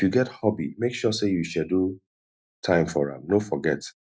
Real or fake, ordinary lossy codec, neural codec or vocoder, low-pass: real; none; none; none